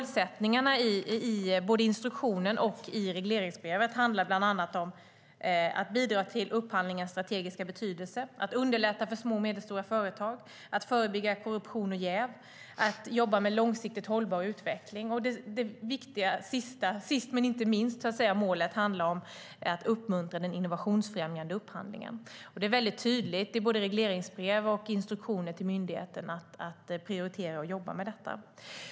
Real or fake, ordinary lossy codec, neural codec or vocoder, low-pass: real; none; none; none